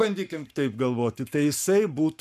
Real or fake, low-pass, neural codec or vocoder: fake; 14.4 kHz; codec, 44.1 kHz, 7.8 kbps, Pupu-Codec